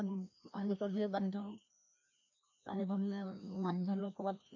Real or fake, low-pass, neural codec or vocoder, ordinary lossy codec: fake; 7.2 kHz; codec, 16 kHz, 1 kbps, FreqCodec, larger model; MP3, 64 kbps